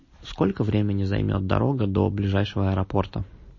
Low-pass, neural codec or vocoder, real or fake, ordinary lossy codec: 7.2 kHz; none; real; MP3, 32 kbps